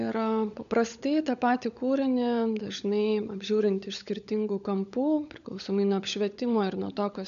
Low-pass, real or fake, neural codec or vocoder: 7.2 kHz; fake; codec, 16 kHz, 16 kbps, FunCodec, trained on LibriTTS, 50 frames a second